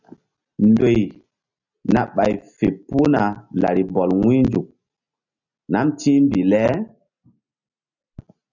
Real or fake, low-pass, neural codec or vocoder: real; 7.2 kHz; none